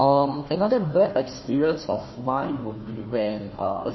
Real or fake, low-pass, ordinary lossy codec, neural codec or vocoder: fake; 7.2 kHz; MP3, 24 kbps; codec, 16 kHz, 1 kbps, FunCodec, trained on Chinese and English, 50 frames a second